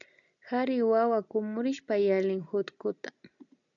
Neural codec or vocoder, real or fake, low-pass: none; real; 7.2 kHz